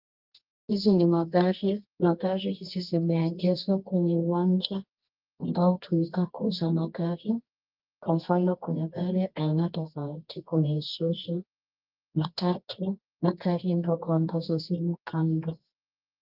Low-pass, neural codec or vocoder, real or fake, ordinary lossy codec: 5.4 kHz; codec, 24 kHz, 0.9 kbps, WavTokenizer, medium music audio release; fake; Opus, 32 kbps